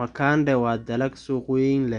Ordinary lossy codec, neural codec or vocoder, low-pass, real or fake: none; none; 9.9 kHz; real